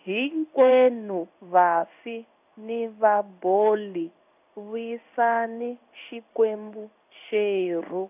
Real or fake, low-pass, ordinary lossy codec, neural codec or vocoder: fake; 3.6 kHz; none; codec, 16 kHz in and 24 kHz out, 1 kbps, XY-Tokenizer